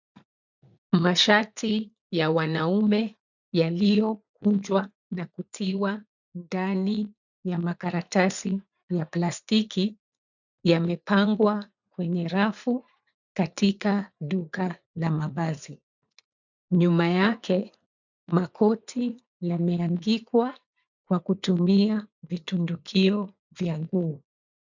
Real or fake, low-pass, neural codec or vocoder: fake; 7.2 kHz; vocoder, 44.1 kHz, 80 mel bands, Vocos